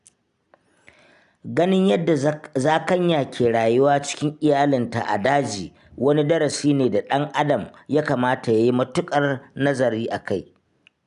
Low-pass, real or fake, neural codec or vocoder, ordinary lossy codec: 10.8 kHz; real; none; none